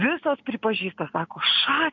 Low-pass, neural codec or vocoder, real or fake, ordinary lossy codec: 7.2 kHz; none; real; MP3, 64 kbps